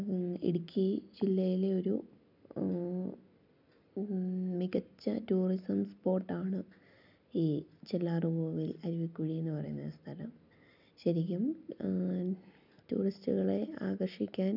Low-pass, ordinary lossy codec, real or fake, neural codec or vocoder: 5.4 kHz; none; real; none